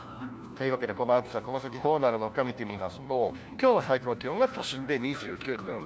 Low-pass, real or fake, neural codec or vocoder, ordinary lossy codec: none; fake; codec, 16 kHz, 1 kbps, FunCodec, trained on LibriTTS, 50 frames a second; none